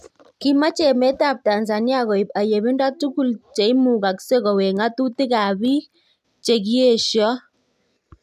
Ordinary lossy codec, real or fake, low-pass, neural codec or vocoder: none; real; 14.4 kHz; none